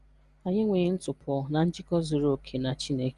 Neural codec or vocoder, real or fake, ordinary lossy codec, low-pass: none; real; Opus, 32 kbps; 10.8 kHz